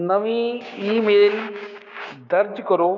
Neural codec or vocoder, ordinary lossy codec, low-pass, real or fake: codec, 16 kHz, 6 kbps, DAC; none; 7.2 kHz; fake